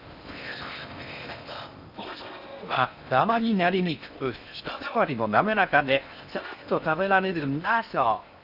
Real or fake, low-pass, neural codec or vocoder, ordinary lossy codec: fake; 5.4 kHz; codec, 16 kHz in and 24 kHz out, 0.6 kbps, FocalCodec, streaming, 4096 codes; none